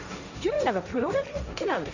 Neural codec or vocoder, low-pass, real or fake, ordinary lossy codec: codec, 16 kHz, 1.1 kbps, Voila-Tokenizer; 7.2 kHz; fake; none